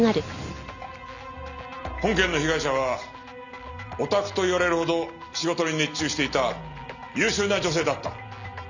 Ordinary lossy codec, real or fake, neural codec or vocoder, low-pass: none; real; none; 7.2 kHz